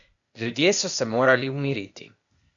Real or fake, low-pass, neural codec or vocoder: fake; 7.2 kHz; codec, 16 kHz, 0.8 kbps, ZipCodec